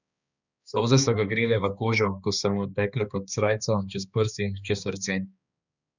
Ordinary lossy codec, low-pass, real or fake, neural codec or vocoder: none; 7.2 kHz; fake; codec, 16 kHz, 2 kbps, X-Codec, HuBERT features, trained on balanced general audio